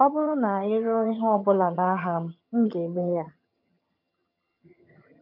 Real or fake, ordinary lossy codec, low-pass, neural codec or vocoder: fake; none; 5.4 kHz; vocoder, 22.05 kHz, 80 mel bands, HiFi-GAN